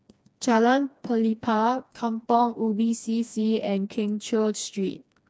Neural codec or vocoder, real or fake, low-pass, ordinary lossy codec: codec, 16 kHz, 2 kbps, FreqCodec, smaller model; fake; none; none